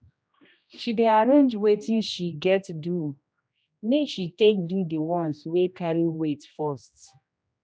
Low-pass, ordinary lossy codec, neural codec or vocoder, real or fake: none; none; codec, 16 kHz, 1 kbps, X-Codec, HuBERT features, trained on general audio; fake